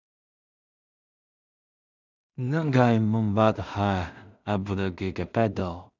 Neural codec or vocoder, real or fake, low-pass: codec, 16 kHz in and 24 kHz out, 0.4 kbps, LongCat-Audio-Codec, two codebook decoder; fake; 7.2 kHz